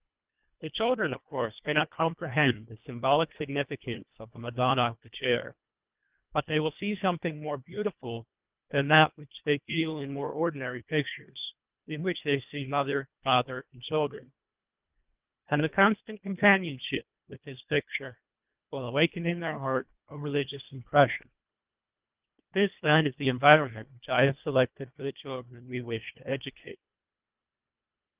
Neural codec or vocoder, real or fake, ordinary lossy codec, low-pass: codec, 24 kHz, 1.5 kbps, HILCodec; fake; Opus, 32 kbps; 3.6 kHz